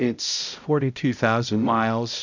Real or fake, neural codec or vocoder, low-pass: fake; codec, 16 kHz, 0.5 kbps, X-Codec, HuBERT features, trained on balanced general audio; 7.2 kHz